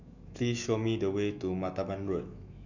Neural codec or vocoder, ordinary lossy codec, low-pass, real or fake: autoencoder, 48 kHz, 128 numbers a frame, DAC-VAE, trained on Japanese speech; none; 7.2 kHz; fake